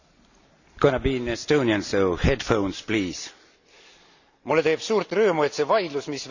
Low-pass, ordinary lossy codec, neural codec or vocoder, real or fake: 7.2 kHz; none; none; real